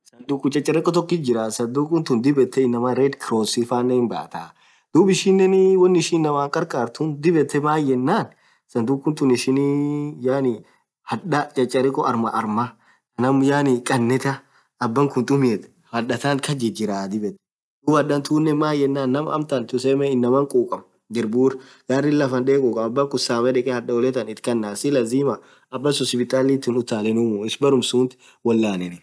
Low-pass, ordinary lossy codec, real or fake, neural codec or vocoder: none; none; real; none